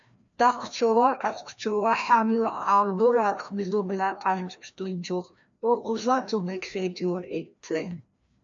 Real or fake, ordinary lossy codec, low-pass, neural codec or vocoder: fake; MP3, 64 kbps; 7.2 kHz; codec, 16 kHz, 1 kbps, FreqCodec, larger model